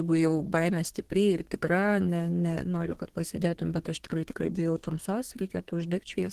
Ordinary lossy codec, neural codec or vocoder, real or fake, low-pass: Opus, 16 kbps; codec, 32 kHz, 1.9 kbps, SNAC; fake; 14.4 kHz